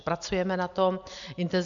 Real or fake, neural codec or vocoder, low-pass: real; none; 7.2 kHz